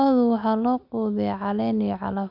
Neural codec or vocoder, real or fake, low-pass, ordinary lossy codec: none; real; 5.4 kHz; none